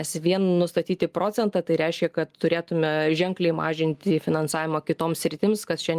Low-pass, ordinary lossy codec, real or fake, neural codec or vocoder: 14.4 kHz; Opus, 32 kbps; real; none